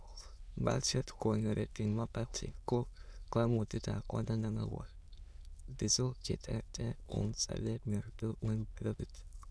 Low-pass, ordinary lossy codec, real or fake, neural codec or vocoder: none; none; fake; autoencoder, 22.05 kHz, a latent of 192 numbers a frame, VITS, trained on many speakers